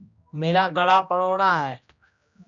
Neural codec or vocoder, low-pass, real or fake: codec, 16 kHz, 1 kbps, X-Codec, HuBERT features, trained on general audio; 7.2 kHz; fake